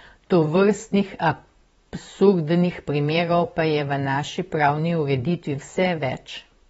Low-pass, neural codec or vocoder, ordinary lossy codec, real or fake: 19.8 kHz; vocoder, 44.1 kHz, 128 mel bands, Pupu-Vocoder; AAC, 24 kbps; fake